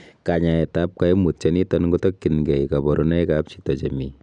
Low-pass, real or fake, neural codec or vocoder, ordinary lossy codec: 9.9 kHz; real; none; none